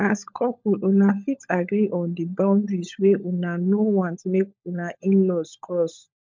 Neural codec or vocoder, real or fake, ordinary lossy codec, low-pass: codec, 16 kHz, 16 kbps, FunCodec, trained on LibriTTS, 50 frames a second; fake; MP3, 64 kbps; 7.2 kHz